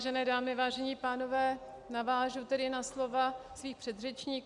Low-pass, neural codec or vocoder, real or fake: 10.8 kHz; none; real